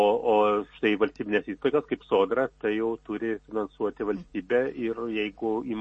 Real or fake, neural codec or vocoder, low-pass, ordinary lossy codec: real; none; 10.8 kHz; MP3, 32 kbps